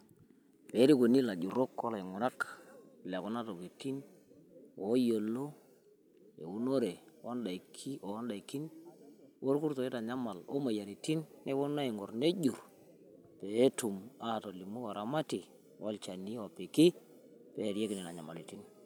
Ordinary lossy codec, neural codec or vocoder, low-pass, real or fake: none; none; none; real